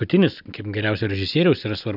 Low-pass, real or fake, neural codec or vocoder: 5.4 kHz; real; none